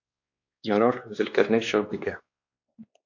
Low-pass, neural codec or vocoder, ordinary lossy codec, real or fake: 7.2 kHz; codec, 16 kHz, 2 kbps, X-Codec, WavLM features, trained on Multilingual LibriSpeech; AAC, 48 kbps; fake